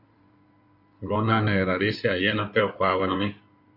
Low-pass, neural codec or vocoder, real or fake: 5.4 kHz; codec, 16 kHz in and 24 kHz out, 2.2 kbps, FireRedTTS-2 codec; fake